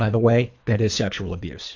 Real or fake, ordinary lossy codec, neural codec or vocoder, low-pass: fake; AAC, 48 kbps; codec, 24 kHz, 3 kbps, HILCodec; 7.2 kHz